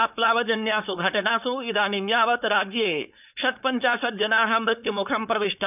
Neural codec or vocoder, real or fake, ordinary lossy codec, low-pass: codec, 16 kHz, 4.8 kbps, FACodec; fake; none; 3.6 kHz